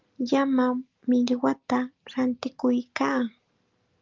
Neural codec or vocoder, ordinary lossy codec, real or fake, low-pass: none; Opus, 32 kbps; real; 7.2 kHz